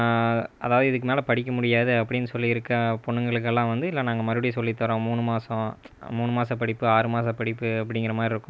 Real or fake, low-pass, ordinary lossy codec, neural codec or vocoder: real; none; none; none